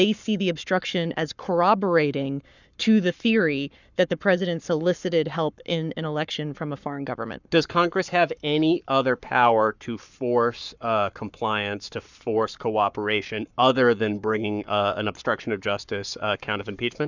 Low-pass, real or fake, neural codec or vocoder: 7.2 kHz; fake; codec, 44.1 kHz, 7.8 kbps, Pupu-Codec